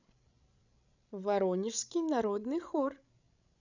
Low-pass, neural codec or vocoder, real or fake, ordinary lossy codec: 7.2 kHz; codec, 16 kHz, 16 kbps, FreqCodec, larger model; fake; none